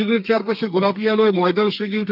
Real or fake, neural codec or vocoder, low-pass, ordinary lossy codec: fake; codec, 32 kHz, 1.9 kbps, SNAC; 5.4 kHz; none